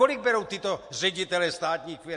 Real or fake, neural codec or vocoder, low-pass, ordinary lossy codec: real; none; 10.8 kHz; MP3, 48 kbps